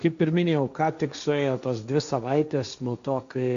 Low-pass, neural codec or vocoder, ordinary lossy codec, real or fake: 7.2 kHz; codec, 16 kHz, 1.1 kbps, Voila-Tokenizer; AAC, 96 kbps; fake